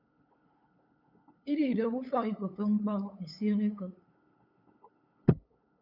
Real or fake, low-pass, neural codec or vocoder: fake; 5.4 kHz; codec, 16 kHz, 8 kbps, FunCodec, trained on LibriTTS, 25 frames a second